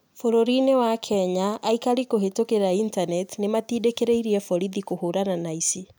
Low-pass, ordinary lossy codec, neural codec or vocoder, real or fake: none; none; none; real